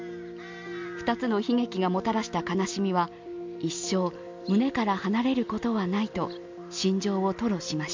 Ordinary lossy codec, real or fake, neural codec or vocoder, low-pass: MP3, 64 kbps; real; none; 7.2 kHz